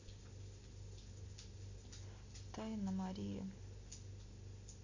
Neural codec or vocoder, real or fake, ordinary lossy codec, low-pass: none; real; AAC, 48 kbps; 7.2 kHz